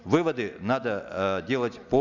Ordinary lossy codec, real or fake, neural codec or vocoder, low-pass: none; real; none; 7.2 kHz